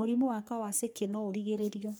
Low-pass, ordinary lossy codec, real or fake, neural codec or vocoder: none; none; fake; codec, 44.1 kHz, 3.4 kbps, Pupu-Codec